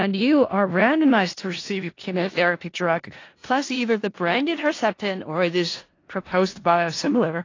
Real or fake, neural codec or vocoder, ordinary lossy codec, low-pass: fake; codec, 16 kHz in and 24 kHz out, 0.4 kbps, LongCat-Audio-Codec, four codebook decoder; AAC, 32 kbps; 7.2 kHz